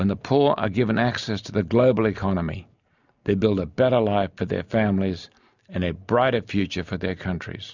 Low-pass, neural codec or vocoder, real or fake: 7.2 kHz; none; real